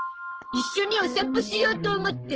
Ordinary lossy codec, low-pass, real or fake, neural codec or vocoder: Opus, 16 kbps; 7.2 kHz; fake; codec, 44.1 kHz, 7.8 kbps, Pupu-Codec